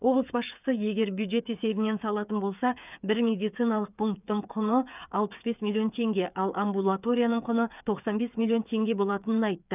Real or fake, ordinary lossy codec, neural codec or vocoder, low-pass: fake; none; codec, 16 kHz, 8 kbps, FreqCodec, smaller model; 3.6 kHz